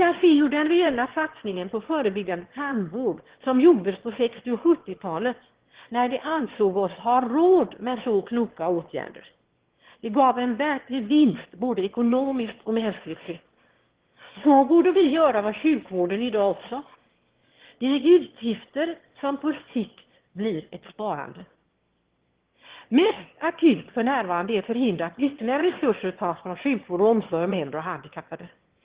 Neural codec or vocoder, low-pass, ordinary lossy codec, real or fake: autoencoder, 22.05 kHz, a latent of 192 numbers a frame, VITS, trained on one speaker; 3.6 kHz; Opus, 16 kbps; fake